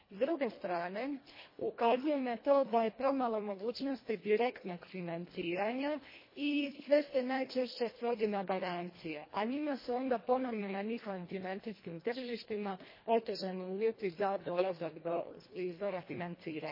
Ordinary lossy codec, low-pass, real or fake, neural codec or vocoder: MP3, 24 kbps; 5.4 kHz; fake; codec, 24 kHz, 1.5 kbps, HILCodec